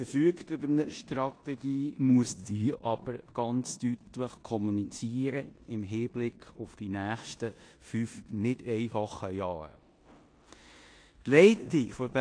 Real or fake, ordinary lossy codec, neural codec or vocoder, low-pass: fake; AAC, 48 kbps; codec, 16 kHz in and 24 kHz out, 0.9 kbps, LongCat-Audio-Codec, four codebook decoder; 9.9 kHz